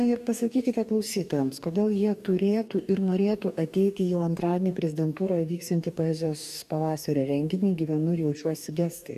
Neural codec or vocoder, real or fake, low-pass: codec, 44.1 kHz, 2.6 kbps, DAC; fake; 14.4 kHz